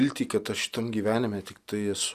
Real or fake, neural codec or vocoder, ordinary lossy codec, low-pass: real; none; Opus, 64 kbps; 14.4 kHz